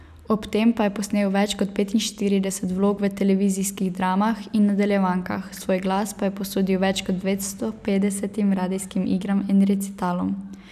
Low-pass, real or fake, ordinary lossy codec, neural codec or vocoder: 14.4 kHz; real; none; none